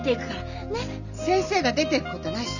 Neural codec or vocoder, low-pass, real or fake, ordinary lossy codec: none; 7.2 kHz; real; none